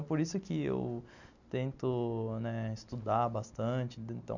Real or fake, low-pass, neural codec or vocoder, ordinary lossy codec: real; 7.2 kHz; none; none